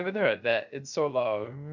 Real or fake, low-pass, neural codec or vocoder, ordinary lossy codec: fake; 7.2 kHz; codec, 16 kHz, 0.3 kbps, FocalCodec; none